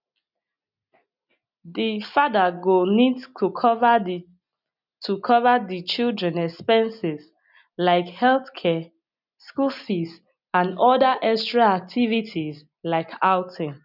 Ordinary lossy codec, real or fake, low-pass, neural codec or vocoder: none; real; 5.4 kHz; none